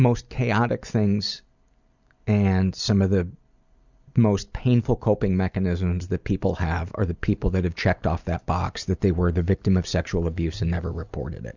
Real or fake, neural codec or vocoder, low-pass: real; none; 7.2 kHz